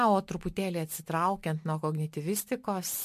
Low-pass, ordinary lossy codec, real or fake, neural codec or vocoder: 14.4 kHz; MP3, 64 kbps; real; none